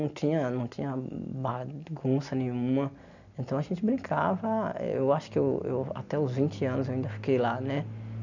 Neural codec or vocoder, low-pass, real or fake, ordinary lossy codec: none; 7.2 kHz; real; none